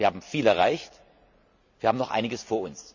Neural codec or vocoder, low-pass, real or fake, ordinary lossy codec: none; 7.2 kHz; real; none